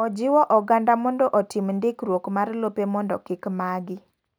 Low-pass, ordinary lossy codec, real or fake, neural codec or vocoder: none; none; real; none